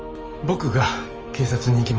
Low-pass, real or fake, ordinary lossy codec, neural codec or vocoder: 7.2 kHz; fake; Opus, 24 kbps; autoencoder, 48 kHz, 128 numbers a frame, DAC-VAE, trained on Japanese speech